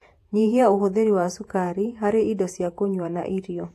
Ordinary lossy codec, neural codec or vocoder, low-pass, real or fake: AAC, 64 kbps; vocoder, 48 kHz, 128 mel bands, Vocos; 14.4 kHz; fake